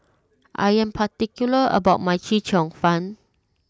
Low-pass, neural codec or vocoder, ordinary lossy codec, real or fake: none; none; none; real